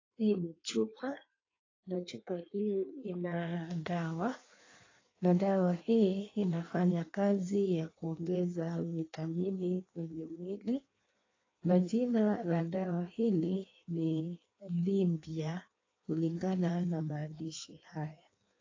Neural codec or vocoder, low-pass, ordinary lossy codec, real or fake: codec, 16 kHz in and 24 kHz out, 1.1 kbps, FireRedTTS-2 codec; 7.2 kHz; AAC, 32 kbps; fake